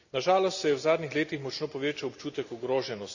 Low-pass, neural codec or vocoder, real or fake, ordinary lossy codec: 7.2 kHz; none; real; none